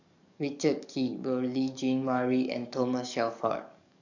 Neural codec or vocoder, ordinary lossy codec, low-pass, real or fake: codec, 44.1 kHz, 7.8 kbps, DAC; none; 7.2 kHz; fake